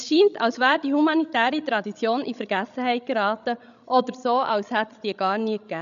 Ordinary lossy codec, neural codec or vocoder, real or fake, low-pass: none; codec, 16 kHz, 16 kbps, FreqCodec, larger model; fake; 7.2 kHz